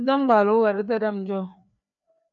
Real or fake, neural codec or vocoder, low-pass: fake; codec, 16 kHz, 2 kbps, FreqCodec, larger model; 7.2 kHz